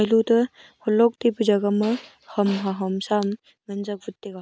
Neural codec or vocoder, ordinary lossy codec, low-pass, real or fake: none; none; none; real